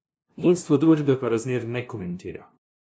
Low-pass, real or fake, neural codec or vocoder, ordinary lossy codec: none; fake; codec, 16 kHz, 0.5 kbps, FunCodec, trained on LibriTTS, 25 frames a second; none